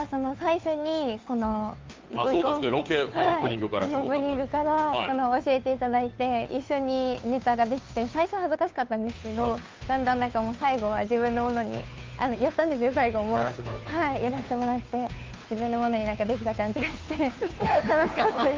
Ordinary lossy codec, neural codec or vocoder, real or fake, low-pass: Opus, 24 kbps; codec, 16 kHz, 2 kbps, FunCodec, trained on Chinese and English, 25 frames a second; fake; 7.2 kHz